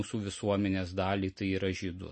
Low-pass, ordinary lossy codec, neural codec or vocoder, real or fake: 10.8 kHz; MP3, 32 kbps; none; real